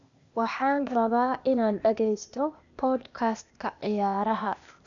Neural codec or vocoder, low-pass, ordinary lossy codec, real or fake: codec, 16 kHz, 0.8 kbps, ZipCodec; 7.2 kHz; none; fake